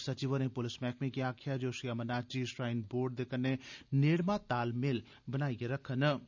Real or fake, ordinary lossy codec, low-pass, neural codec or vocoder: real; none; 7.2 kHz; none